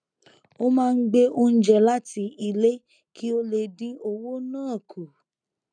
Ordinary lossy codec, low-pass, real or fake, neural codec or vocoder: none; 9.9 kHz; real; none